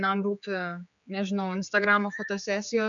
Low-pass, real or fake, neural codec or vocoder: 7.2 kHz; fake; codec, 16 kHz, 4 kbps, X-Codec, HuBERT features, trained on general audio